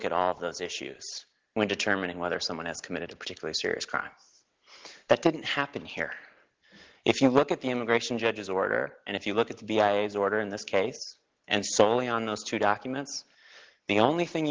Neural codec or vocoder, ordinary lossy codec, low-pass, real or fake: none; Opus, 16 kbps; 7.2 kHz; real